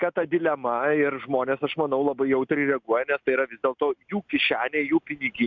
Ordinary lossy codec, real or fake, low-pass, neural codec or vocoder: MP3, 64 kbps; real; 7.2 kHz; none